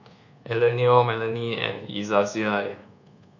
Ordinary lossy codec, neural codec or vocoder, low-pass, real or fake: none; codec, 24 kHz, 1.2 kbps, DualCodec; 7.2 kHz; fake